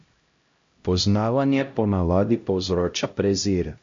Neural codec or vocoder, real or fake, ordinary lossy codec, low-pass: codec, 16 kHz, 0.5 kbps, X-Codec, HuBERT features, trained on LibriSpeech; fake; MP3, 48 kbps; 7.2 kHz